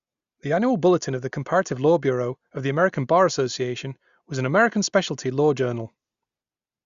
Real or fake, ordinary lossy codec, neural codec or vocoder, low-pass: real; Opus, 64 kbps; none; 7.2 kHz